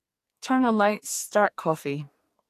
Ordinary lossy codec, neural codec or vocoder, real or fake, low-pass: AAC, 64 kbps; codec, 44.1 kHz, 2.6 kbps, SNAC; fake; 14.4 kHz